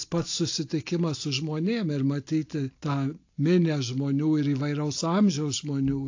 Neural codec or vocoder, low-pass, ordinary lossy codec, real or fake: none; 7.2 kHz; AAC, 48 kbps; real